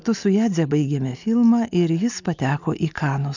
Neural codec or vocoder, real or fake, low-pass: none; real; 7.2 kHz